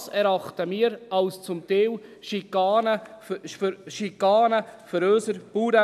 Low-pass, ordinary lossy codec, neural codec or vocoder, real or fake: 14.4 kHz; none; none; real